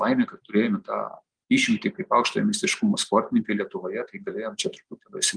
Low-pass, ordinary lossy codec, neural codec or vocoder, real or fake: 9.9 kHz; Opus, 32 kbps; none; real